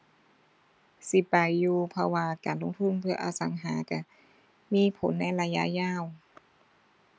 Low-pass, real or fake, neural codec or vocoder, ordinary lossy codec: none; real; none; none